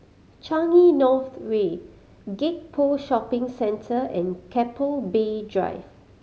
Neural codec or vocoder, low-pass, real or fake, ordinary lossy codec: none; none; real; none